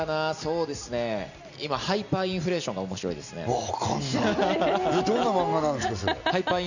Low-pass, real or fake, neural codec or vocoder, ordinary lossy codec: 7.2 kHz; real; none; none